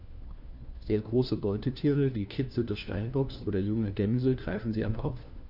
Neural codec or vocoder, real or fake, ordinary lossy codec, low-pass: codec, 16 kHz, 1 kbps, FunCodec, trained on LibriTTS, 50 frames a second; fake; none; 5.4 kHz